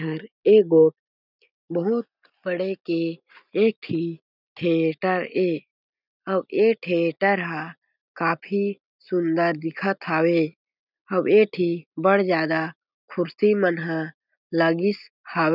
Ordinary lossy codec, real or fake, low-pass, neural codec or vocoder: none; real; 5.4 kHz; none